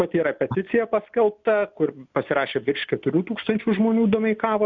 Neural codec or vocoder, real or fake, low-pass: none; real; 7.2 kHz